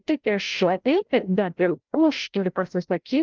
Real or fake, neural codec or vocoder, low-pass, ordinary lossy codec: fake; codec, 16 kHz, 0.5 kbps, FreqCodec, larger model; 7.2 kHz; Opus, 32 kbps